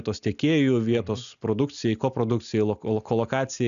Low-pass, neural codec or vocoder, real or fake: 7.2 kHz; none; real